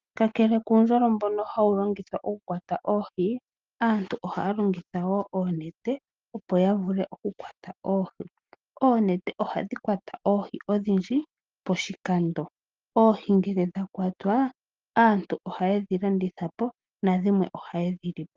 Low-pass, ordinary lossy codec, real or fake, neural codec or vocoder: 7.2 kHz; Opus, 24 kbps; real; none